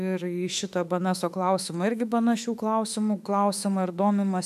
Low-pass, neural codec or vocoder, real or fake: 14.4 kHz; autoencoder, 48 kHz, 32 numbers a frame, DAC-VAE, trained on Japanese speech; fake